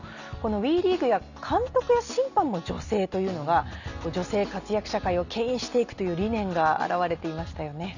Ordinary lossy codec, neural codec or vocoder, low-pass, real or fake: none; none; 7.2 kHz; real